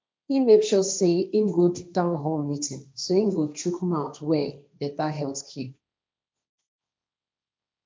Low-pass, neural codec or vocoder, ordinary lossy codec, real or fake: none; codec, 16 kHz, 1.1 kbps, Voila-Tokenizer; none; fake